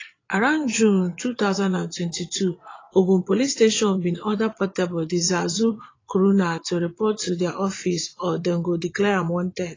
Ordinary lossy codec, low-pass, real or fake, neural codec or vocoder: AAC, 32 kbps; 7.2 kHz; fake; vocoder, 22.05 kHz, 80 mel bands, Vocos